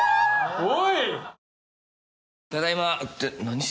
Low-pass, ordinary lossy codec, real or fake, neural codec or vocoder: none; none; real; none